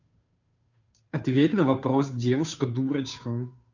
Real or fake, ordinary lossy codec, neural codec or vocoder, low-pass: fake; none; codec, 16 kHz, 2 kbps, FunCodec, trained on Chinese and English, 25 frames a second; 7.2 kHz